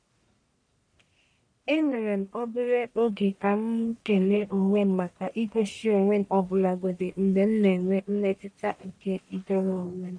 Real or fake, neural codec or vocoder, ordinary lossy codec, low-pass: fake; codec, 44.1 kHz, 1.7 kbps, Pupu-Codec; none; 9.9 kHz